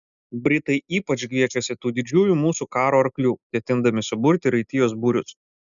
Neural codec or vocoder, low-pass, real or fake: none; 7.2 kHz; real